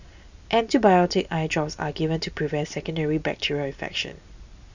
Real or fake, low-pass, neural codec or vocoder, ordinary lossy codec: real; 7.2 kHz; none; none